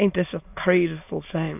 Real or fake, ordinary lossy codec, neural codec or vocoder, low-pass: fake; AAC, 32 kbps; autoencoder, 22.05 kHz, a latent of 192 numbers a frame, VITS, trained on many speakers; 3.6 kHz